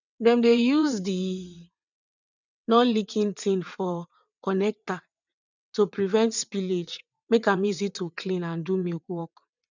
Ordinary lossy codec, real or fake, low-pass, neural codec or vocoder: none; fake; 7.2 kHz; vocoder, 22.05 kHz, 80 mel bands, WaveNeXt